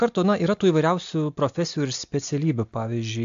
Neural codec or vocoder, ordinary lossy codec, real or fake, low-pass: none; AAC, 64 kbps; real; 7.2 kHz